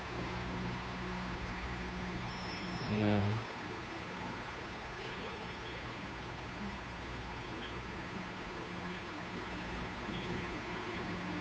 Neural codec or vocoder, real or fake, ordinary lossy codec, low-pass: codec, 16 kHz, 2 kbps, FunCodec, trained on Chinese and English, 25 frames a second; fake; none; none